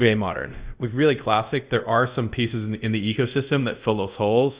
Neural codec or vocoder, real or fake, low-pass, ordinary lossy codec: codec, 24 kHz, 0.5 kbps, DualCodec; fake; 3.6 kHz; Opus, 64 kbps